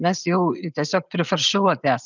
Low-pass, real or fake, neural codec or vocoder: 7.2 kHz; real; none